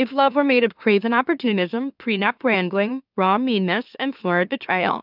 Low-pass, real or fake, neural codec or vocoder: 5.4 kHz; fake; autoencoder, 44.1 kHz, a latent of 192 numbers a frame, MeloTTS